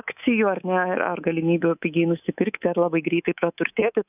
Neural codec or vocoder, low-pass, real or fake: codec, 24 kHz, 3.1 kbps, DualCodec; 3.6 kHz; fake